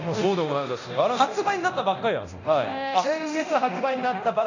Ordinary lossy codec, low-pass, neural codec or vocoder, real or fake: none; 7.2 kHz; codec, 24 kHz, 0.9 kbps, DualCodec; fake